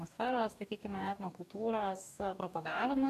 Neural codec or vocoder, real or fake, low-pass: codec, 44.1 kHz, 2.6 kbps, DAC; fake; 14.4 kHz